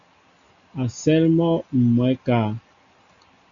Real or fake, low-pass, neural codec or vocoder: real; 7.2 kHz; none